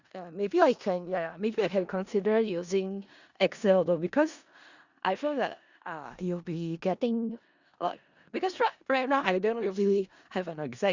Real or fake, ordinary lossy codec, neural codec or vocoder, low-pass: fake; Opus, 64 kbps; codec, 16 kHz in and 24 kHz out, 0.4 kbps, LongCat-Audio-Codec, four codebook decoder; 7.2 kHz